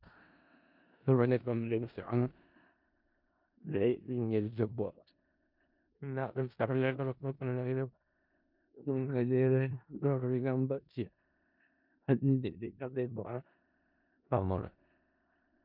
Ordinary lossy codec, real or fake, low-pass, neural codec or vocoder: MP3, 48 kbps; fake; 5.4 kHz; codec, 16 kHz in and 24 kHz out, 0.4 kbps, LongCat-Audio-Codec, four codebook decoder